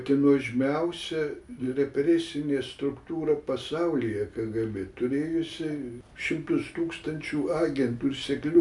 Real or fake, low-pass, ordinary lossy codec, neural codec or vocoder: real; 10.8 kHz; MP3, 96 kbps; none